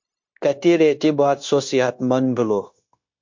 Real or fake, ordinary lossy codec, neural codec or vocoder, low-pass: fake; MP3, 48 kbps; codec, 16 kHz, 0.9 kbps, LongCat-Audio-Codec; 7.2 kHz